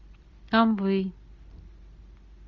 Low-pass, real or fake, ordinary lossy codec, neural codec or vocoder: 7.2 kHz; real; MP3, 48 kbps; none